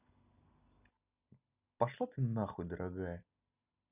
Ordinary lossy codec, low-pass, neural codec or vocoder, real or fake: none; 3.6 kHz; none; real